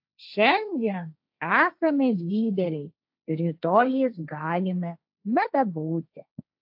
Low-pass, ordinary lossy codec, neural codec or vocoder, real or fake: 5.4 kHz; AAC, 48 kbps; codec, 16 kHz, 1.1 kbps, Voila-Tokenizer; fake